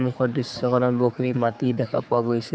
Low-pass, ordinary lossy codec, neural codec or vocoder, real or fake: none; none; codec, 16 kHz, 4 kbps, X-Codec, HuBERT features, trained on general audio; fake